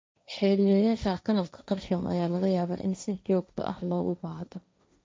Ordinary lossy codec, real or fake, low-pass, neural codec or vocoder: none; fake; none; codec, 16 kHz, 1.1 kbps, Voila-Tokenizer